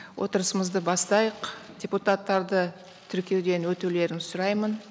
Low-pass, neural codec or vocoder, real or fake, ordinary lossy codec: none; none; real; none